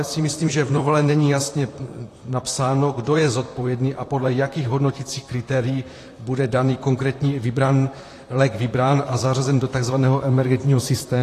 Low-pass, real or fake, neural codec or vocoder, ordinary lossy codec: 14.4 kHz; fake; vocoder, 44.1 kHz, 128 mel bands, Pupu-Vocoder; AAC, 48 kbps